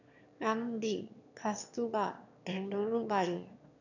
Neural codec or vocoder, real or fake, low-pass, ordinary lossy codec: autoencoder, 22.05 kHz, a latent of 192 numbers a frame, VITS, trained on one speaker; fake; 7.2 kHz; none